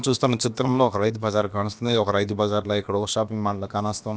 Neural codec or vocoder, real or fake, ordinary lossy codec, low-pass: codec, 16 kHz, about 1 kbps, DyCAST, with the encoder's durations; fake; none; none